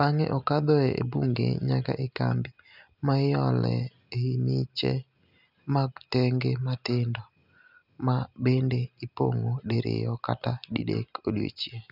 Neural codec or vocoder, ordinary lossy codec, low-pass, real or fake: none; none; 5.4 kHz; real